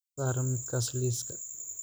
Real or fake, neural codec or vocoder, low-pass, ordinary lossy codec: real; none; none; none